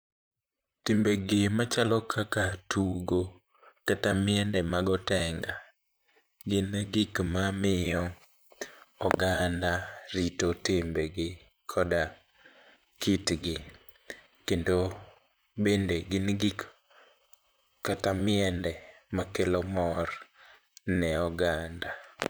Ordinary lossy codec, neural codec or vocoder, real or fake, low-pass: none; vocoder, 44.1 kHz, 128 mel bands, Pupu-Vocoder; fake; none